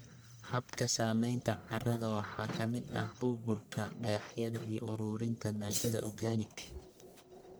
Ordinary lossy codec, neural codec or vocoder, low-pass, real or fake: none; codec, 44.1 kHz, 1.7 kbps, Pupu-Codec; none; fake